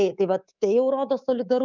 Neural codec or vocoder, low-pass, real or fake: codec, 24 kHz, 3.1 kbps, DualCodec; 7.2 kHz; fake